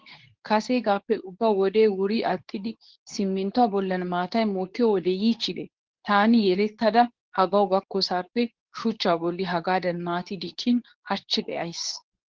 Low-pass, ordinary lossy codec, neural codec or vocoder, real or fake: 7.2 kHz; Opus, 16 kbps; codec, 24 kHz, 0.9 kbps, WavTokenizer, medium speech release version 2; fake